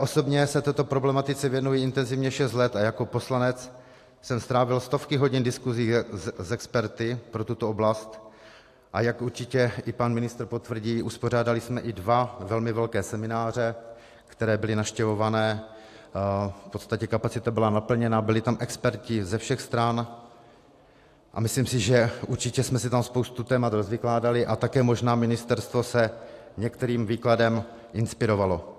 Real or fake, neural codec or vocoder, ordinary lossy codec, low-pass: real; none; AAC, 64 kbps; 14.4 kHz